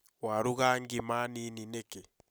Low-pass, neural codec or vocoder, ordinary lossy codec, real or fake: none; none; none; real